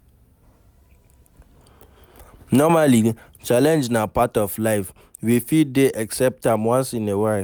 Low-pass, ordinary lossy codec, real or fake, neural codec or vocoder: none; none; real; none